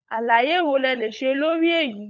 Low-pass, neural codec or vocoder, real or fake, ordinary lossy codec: none; codec, 16 kHz, 16 kbps, FunCodec, trained on LibriTTS, 50 frames a second; fake; none